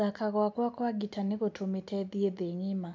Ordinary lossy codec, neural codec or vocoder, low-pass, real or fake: none; none; none; real